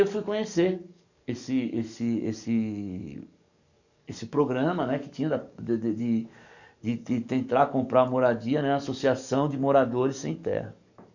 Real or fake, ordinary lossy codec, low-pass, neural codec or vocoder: fake; none; 7.2 kHz; codec, 44.1 kHz, 7.8 kbps, DAC